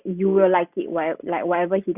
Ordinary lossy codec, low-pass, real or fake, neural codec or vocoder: Opus, 64 kbps; 3.6 kHz; real; none